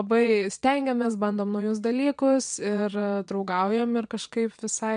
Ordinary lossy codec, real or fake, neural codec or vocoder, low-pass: MP3, 64 kbps; fake; vocoder, 22.05 kHz, 80 mel bands, WaveNeXt; 9.9 kHz